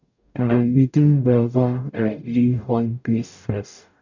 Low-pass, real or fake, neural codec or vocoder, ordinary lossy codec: 7.2 kHz; fake; codec, 44.1 kHz, 0.9 kbps, DAC; none